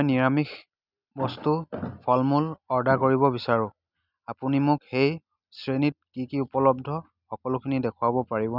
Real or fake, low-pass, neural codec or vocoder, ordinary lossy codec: real; 5.4 kHz; none; none